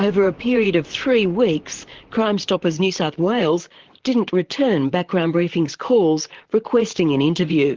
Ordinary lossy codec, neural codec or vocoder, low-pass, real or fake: Opus, 16 kbps; vocoder, 44.1 kHz, 128 mel bands, Pupu-Vocoder; 7.2 kHz; fake